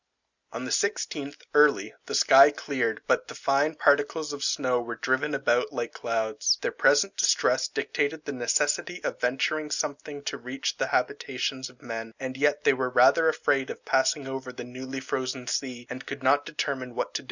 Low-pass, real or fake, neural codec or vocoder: 7.2 kHz; real; none